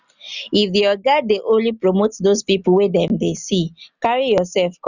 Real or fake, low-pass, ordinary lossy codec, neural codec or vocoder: real; 7.2 kHz; none; none